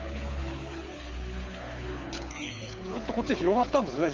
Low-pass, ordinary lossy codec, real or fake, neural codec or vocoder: 7.2 kHz; Opus, 32 kbps; fake; codec, 24 kHz, 6 kbps, HILCodec